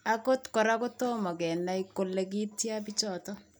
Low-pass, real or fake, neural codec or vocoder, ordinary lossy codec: none; real; none; none